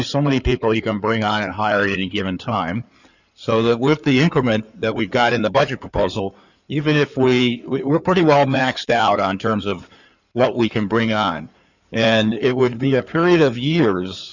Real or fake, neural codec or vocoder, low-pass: fake; codec, 16 kHz in and 24 kHz out, 2.2 kbps, FireRedTTS-2 codec; 7.2 kHz